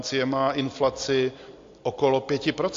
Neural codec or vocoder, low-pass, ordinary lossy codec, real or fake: none; 7.2 kHz; AAC, 48 kbps; real